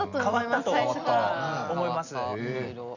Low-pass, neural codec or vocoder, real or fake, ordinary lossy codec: 7.2 kHz; none; real; none